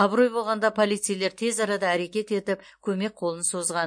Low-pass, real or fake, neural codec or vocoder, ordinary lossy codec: 9.9 kHz; real; none; MP3, 48 kbps